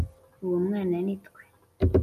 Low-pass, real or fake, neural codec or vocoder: 14.4 kHz; real; none